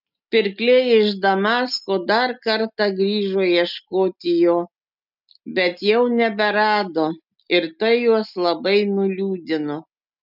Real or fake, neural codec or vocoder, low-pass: real; none; 5.4 kHz